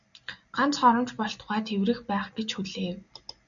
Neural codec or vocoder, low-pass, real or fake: none; 7.2 kHz; real